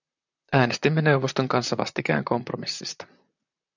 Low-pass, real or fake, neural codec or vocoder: 7.2 kHz; fake; vocoder, 44.1 kHz, 128 mel bands, Pupu-Vocoder